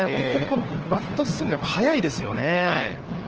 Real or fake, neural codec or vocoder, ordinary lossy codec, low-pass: fake; codec, 16 kHz, 4 kbps, FunCodec, trained on LibriTTS, 50 frames a second; Opus, 16 kbps; 7.2 kHz